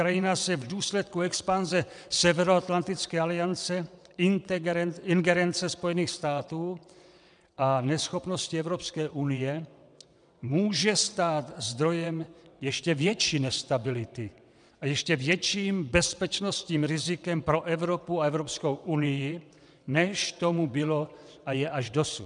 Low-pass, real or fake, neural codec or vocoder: 9.9 kHz; fake; vocoder, 22.05 kHz, 80 mel bands, WaveNeXt